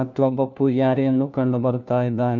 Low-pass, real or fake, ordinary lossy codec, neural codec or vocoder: 7.2 kHz; fake; MP3, 64 kbps; codec, 16 kHz, 1 kbps, FunCodec, trained on LibriTTS, 50 frames a second